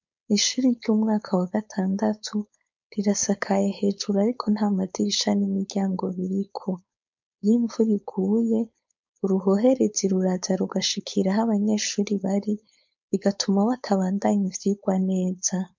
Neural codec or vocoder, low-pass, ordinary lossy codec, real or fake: codec, 16 kHz, 4.8 kbps, FACodec; 7.2 kHz; MP3, 64 kbps; fake